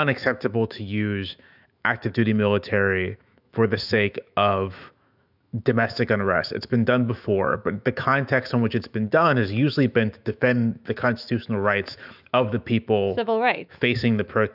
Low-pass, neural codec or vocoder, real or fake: 5.4 kHz; autoencoder, 48 kHz, 128 numbers a frame, DAC-VAE, trained on Japanese speech; fake